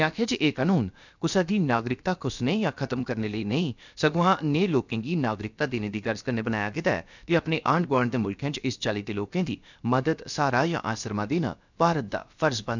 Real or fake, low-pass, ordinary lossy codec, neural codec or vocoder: fake; 7.2 kHz; none; codec, 16 kHz, about 1 kbps, DyCAST, with the encoder's durations